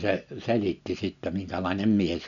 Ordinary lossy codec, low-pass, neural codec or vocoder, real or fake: none; 7.2 kHz; none; real